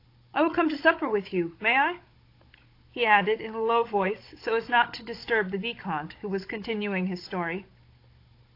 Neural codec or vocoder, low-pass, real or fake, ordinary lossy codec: codec, 16 kHz, 16 kbps, FunCodec, trained on Chinese and English, 50 frames a second; 5.4 kHz; fake; AAC, 32 kbps